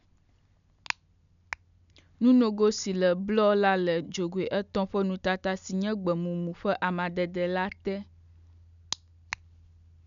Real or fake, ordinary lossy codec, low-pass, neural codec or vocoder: real; none; 7.2 kHz; none